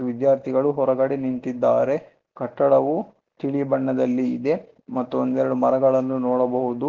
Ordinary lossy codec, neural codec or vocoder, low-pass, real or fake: Opus, 16 kbps; none; 7.2 kHz; real